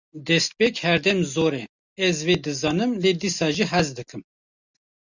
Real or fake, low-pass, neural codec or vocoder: real; 7.2 kHz; none